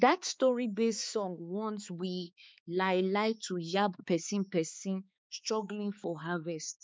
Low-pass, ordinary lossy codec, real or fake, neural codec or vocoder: none; none; fake; codec, 16 kHz, 4 kbps, X-Codec, HuBERT features, trained on balanced general audio